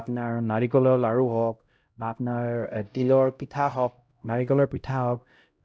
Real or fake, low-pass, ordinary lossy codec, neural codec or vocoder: fake; none; none; codec, 16 kHz, 0.5 kbps, X-Codec, HuBERT features, trained on LibriSpeech